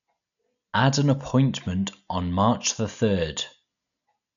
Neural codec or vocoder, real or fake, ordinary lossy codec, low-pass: none; real; none; 7.2 kHz